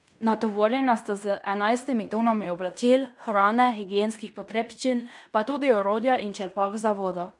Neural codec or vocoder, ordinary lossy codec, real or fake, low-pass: codec, 16 kHz in and 24 kHz out, 0.9 kbps, LongCat-Audio-Codec, fine tuned four codebook decoder; none; fake; 10.8 kHz